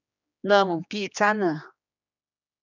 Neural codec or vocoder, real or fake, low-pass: codec, 16 kHz, 2 kbps, X-Codec, HuBERT features, trained on general audio; fake; 7.2 kHz